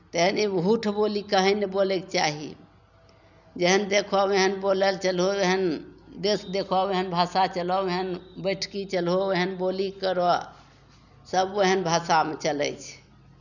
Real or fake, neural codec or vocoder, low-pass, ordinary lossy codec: real; none; 7.2 kHz; none